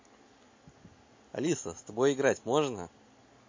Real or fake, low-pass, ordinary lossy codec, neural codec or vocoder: real; 7.2 kHz; MP3, 32 kbps; none